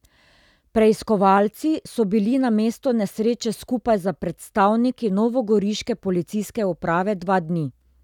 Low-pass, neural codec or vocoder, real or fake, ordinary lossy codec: 19.8 kHz; none; real; none